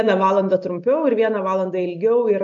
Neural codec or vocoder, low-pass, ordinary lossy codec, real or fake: none; 7.2 kHz; AAC, 64 kbps; real